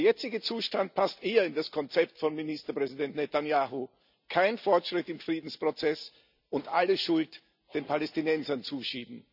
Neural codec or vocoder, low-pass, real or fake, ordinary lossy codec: none; 5.4 kHz; real; none